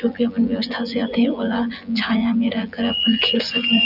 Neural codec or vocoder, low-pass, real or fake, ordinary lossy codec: vocoder, 24 kHz, 100 mel bands, Vocos; 5.4 kHz; fake; none